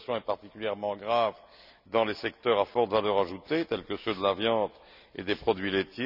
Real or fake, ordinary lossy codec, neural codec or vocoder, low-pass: real; none; none; 5.4 kHz